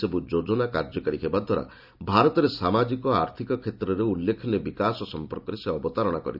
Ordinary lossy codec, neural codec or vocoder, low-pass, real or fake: none; none; 5.4 kHz; real